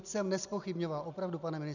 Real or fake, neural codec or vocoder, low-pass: real; none; 7.2 kHz